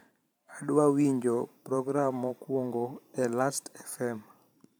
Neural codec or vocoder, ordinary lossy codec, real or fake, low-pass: vocoder, 44.1 kHz, 128 mel bands every 512 samples, BigVGAN v2; none; fake; none